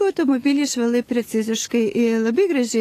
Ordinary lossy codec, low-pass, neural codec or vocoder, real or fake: AAC, 48 kbps; 14.4 kHz; autoencoder, 48 kHz, 128 numbers a frame, DAC-VAE, trained on Japanese speech; fake